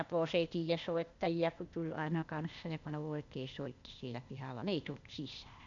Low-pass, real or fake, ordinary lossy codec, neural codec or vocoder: 7.2 kHz; fake; none; codec, 16 kHz, 0.8 kbps, ZipCodec